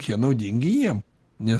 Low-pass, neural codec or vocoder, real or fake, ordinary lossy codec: 10.8 kHz; none; real; Opus, 16 kbps